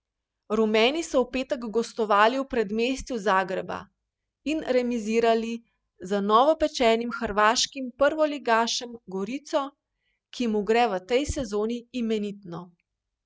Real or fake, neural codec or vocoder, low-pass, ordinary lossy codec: real; none; none; none